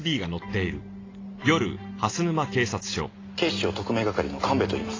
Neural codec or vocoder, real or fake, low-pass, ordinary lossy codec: none; real; 7.2 kHz; AAC, 32 kbps